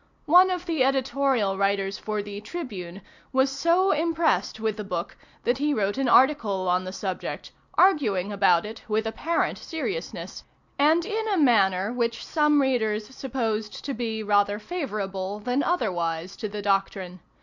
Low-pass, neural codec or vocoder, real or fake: 7.2 kHz; none; real